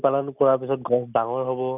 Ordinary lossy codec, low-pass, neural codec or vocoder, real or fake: none; 3.6 kHz; none; real